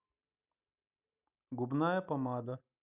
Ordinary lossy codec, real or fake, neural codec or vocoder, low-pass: none; real; none; 3.6 kHz